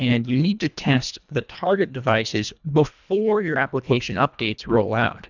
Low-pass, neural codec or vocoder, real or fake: 7.2 kHz; codec, 24 kHz, 1.5 kbps, HILCodec; fake